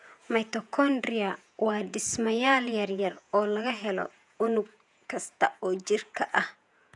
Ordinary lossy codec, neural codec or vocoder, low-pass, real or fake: none; vocoder, 48 kHz, 128 mel bands, Vocos; 10.8 kHz; fake